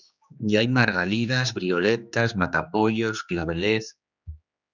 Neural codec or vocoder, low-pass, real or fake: codec, 16 kHz, 2 kbps, X-Codec, HuBERT features, trained on general audio; 7.2 kHz; fake